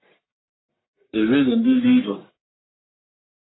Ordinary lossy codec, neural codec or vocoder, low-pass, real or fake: AAC, 16 kbps; codec, 44.1 kHz, 1.7 kbps, Pupu-Codec; 7.2 kHz; fake